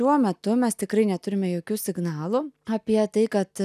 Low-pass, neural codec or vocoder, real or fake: 14.4 kHz; none; real